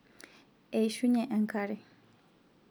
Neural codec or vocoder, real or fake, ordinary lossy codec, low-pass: none; real; none; none